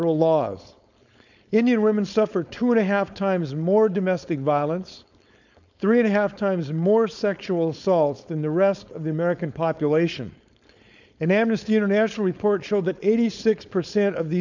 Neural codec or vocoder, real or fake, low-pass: codec, 16 kHz, 4.8 kbps, FACodec; fake; 7.2 kHz